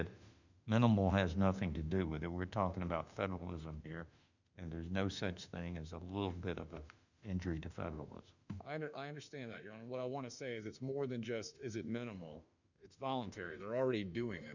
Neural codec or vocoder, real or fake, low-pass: autoencoder, 48 kHz, 32 numbers a frame, DAC-VAE, trained on Japanese speech; fake; 7.2 kHz